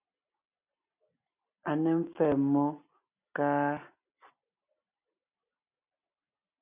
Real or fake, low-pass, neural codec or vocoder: real; 3.6 kHz; none